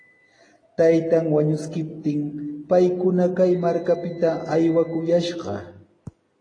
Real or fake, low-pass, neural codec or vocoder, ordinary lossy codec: real; 9.9 kHz; none; AAC, 32 kbps